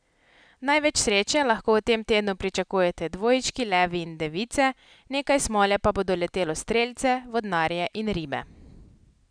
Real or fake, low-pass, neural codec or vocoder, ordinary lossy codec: real; 9.9 kHz; none; none